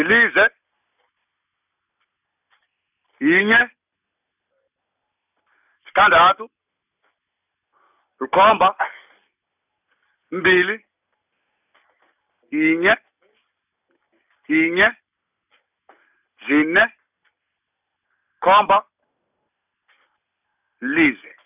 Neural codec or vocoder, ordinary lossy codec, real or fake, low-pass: none; none; real; 3.6 kHz